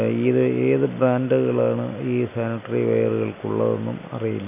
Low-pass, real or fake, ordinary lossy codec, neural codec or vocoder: 3.6 kHz; real; none; none